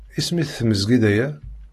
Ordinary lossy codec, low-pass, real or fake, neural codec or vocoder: AAC, 48 kbps; 14.4 kHz; real; none